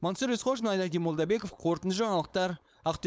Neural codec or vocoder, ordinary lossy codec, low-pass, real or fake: codec, 16 kHz, 4.8 kbps, FACodec; none; none; fake